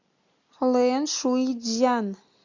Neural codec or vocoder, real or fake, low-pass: none; real; 7.2 kHz